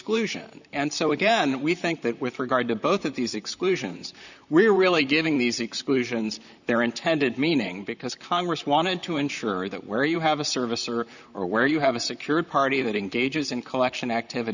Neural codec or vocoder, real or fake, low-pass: vocoder, 44.1 kHz, 128 mel bands, Pupu-Vocoder; fake; 7.2 kHz